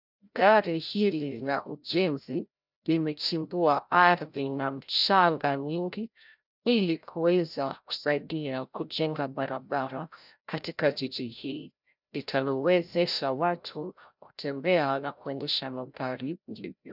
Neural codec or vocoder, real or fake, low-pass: codec, 16 kHz, 0.5 kbps, FreqCodec, larger model; fake; 5.4 kHz